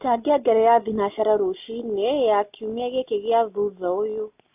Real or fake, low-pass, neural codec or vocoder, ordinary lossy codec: real; 3.6 kHz; none; AAC, 32 kbps